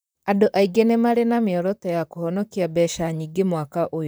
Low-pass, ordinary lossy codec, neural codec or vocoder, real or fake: none; none; vocoder, 44.1 kHz, 128 mel bands, Pupu-Vocoder; fake